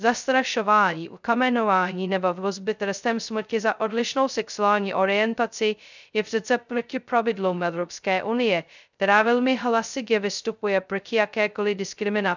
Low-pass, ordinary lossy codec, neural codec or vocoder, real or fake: 7.2 kHz; none; codec, 16 kHz, 0.2 kbps, FocalCodec; fake